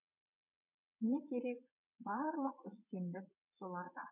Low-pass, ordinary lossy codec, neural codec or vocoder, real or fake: 3.6 kHz; none; vocoder, 22.05 kHz, 80 mel bands, Vocos; fake